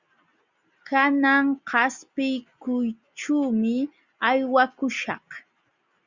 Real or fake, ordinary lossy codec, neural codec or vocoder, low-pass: real; Opus, 64 kbps; none; 7.2 kHz